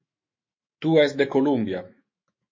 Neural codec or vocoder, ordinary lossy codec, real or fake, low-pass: autoencoder, 48 kHz, 128 numbers a frame, DAC-VAE, trained on Japanese speech; MP3, 32 kbps; fake; 7.2 kHz